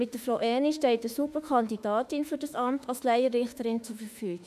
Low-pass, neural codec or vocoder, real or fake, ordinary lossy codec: 14.4 kHz; autoencoder, 48 kHz, 32 numbers a frame, DAC-VAE, trained on Japanese speech; fake; none